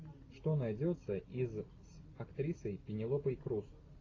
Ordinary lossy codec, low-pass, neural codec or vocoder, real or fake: AAC, 32 kbps; 7.2 kHz; none; real